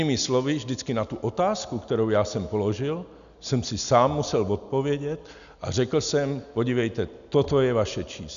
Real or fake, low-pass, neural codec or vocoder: real; 7.2 kHz; none